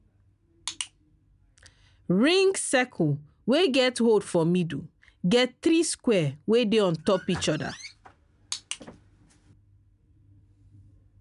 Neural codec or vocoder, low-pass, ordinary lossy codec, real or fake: none; 10.8 kHz; none; real